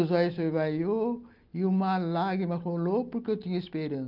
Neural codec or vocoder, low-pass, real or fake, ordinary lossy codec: none; 5.4 kHz; real; Opus, 24 kbps